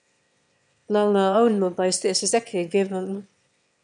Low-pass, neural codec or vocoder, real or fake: 9.9 kHz; autoencoder, 22.05 kHz, a latent of 192 numbers a frame, VITS, trained on one speaker; fake